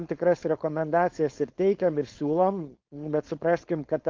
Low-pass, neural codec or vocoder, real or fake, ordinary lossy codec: 7.2 kHz; codec, 16 kHz, 4.8 kbps, FACodec; fake; Opus, 24 kbps